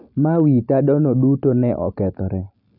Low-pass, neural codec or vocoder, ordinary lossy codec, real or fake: 5.4 kHz; none; none; real